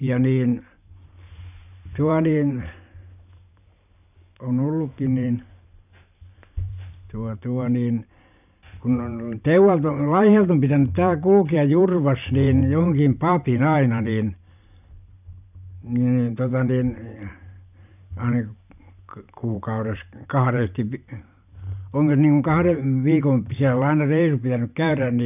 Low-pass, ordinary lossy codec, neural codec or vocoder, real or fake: 3.6 kHz; none; vocoder, 44.1 kHz, 128 mel bands every 512 samples, BigVGAN v2; fake